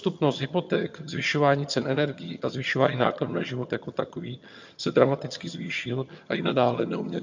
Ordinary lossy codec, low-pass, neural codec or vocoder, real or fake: MP3, 48 kbps; 7.2 kHz; vocoder, 22.05 kHz, 80 mel bands, HiFi-GAN; fake